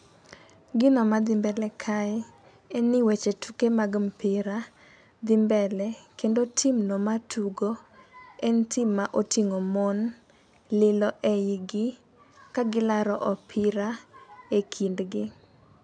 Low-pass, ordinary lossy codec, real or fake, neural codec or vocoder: 9.9 kHz; none; real; none